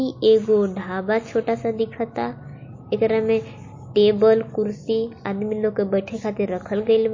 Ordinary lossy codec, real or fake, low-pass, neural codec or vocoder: MP3, 32 kbps; real; 7.2 kHz; none